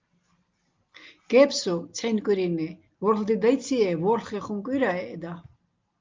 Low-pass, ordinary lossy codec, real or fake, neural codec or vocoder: 7.2 kHz; Opus, 32 kbps; real; none